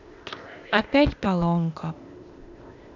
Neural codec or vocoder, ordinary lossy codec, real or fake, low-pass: codec, 16 kHz, 0.8 kbps, ZipCodec; none; fake; 7.2 kHz